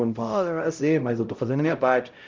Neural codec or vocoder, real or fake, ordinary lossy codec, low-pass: codec, 16 kHz, 0.5 kbps, X-Codec, HuBERT features, trained on LibriSpeech; fake; Opus, 24 kbps; 7.2 kHz